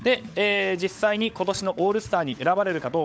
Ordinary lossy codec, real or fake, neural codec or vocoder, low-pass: none; fake; codec, 16 kHz, 16 kbps, FunCodec, trained on LibriTTS, 50 frames a second; none